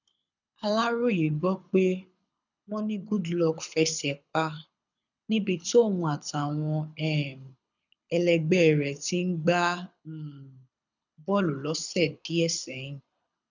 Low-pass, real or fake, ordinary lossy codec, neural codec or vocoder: 7.2 kHz; fake; none; codec, 24 kHz, 6 kbps, HILCodec